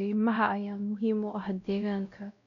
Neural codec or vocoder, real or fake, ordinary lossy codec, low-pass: codec, 16 kHz, 0.5 kbps, X-Codec, WavLM features, trained on Multilingual LibriSpeech; fake; none; 7.2 kHz